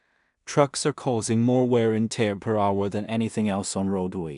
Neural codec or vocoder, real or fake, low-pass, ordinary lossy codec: codec, 16 kHz in and 24 kHz out, 0.4 kbps, LongCat-Audio-Codec, two codebook decoder; fake; 10.8 kHz; none